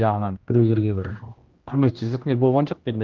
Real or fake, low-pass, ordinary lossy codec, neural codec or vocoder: fake; 7.2 kHz; Opus, 32 kbps; codec, 16 kHz, 1 kbps, X-Codec, HuBERT features, trained on general audio